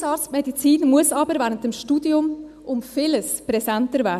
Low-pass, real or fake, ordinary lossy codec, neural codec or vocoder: 14.4 kHz; real; none; none